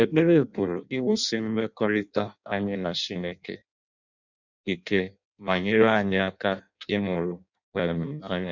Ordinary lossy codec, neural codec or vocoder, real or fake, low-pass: none; codec, 16 kHz in and 24 kHz out, 0.6 kbps, FireRedTTS-2 codec; fake; 7.2 kHz